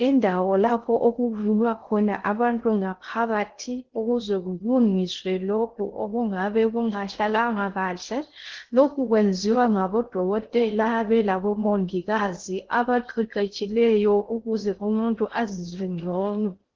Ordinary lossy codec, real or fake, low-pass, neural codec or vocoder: Opus, 16 kbps; fake; 7.2 kHz; codec, 16 kHz in and 24 kHz out, 0.6 kbps, FocalCodec, streaming, 2048 codes